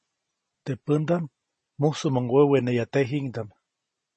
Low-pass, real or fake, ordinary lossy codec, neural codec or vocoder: 10.8 kHz; real; MP3, 32 kbps; none